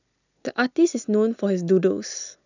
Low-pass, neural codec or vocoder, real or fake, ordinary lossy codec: 7.2 kHz; none; real; none